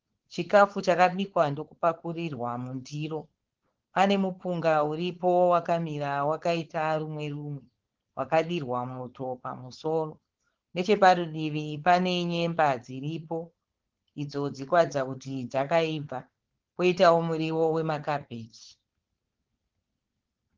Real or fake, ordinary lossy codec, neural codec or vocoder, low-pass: fake; Opus, 16 kbps; codec, 16 kHz, 4.8 kbps, FACodec; 7.2 kHz